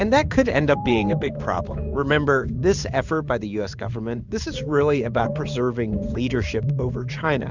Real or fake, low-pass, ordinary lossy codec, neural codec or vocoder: fake; 7.2 kHz; Opus, 64 kbps; codec, 16 kHz in and 24 kHz out, 1 kbps, XY-Tokenizer